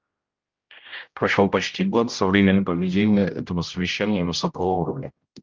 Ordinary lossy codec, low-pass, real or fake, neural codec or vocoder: Opus, 32 kbps; 7.2 kHz; fake; codec, 16 kHz, 0.5 kbps, X-Codec, HuBERT features, trained on general audio